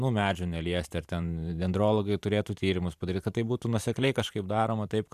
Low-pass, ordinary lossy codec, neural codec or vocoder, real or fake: 14.4 kHz; AAC, 96 kbps; vocoder, 44.1 kHz, 128 mel bands every 512 samples, BigVGAN v2; fake